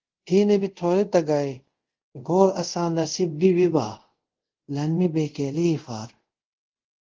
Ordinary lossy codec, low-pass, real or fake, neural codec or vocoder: Opus, 16 kbps; 7.2 kHz; fake; codec, 24 kHz, 0.5 kbps, DualCodec